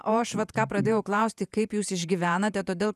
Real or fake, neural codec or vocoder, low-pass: fake; vocoder, 48 kHz, 128 mel bands, Vocos; 14.4 kHz